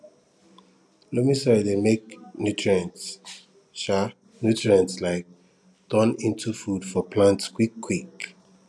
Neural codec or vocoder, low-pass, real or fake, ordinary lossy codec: none; none; real; none